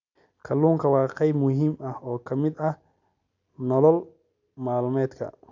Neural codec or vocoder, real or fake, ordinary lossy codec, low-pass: none; real; none; 7.2 kHz